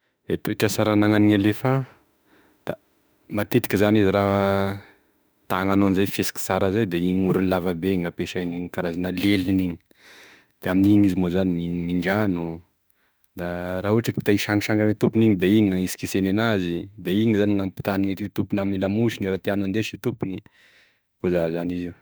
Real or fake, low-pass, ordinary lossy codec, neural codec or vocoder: fake; none; none; autoencoder, 48 kHz, 32 numbers a frame, DAC-VAE, trained on Japanese speech